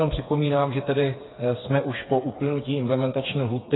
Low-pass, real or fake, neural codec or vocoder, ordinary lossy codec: 7.2 kHz; fake; codec, 16 kHz, 4 kbps, FreqCodec, smaller model; AAC, 16 kbps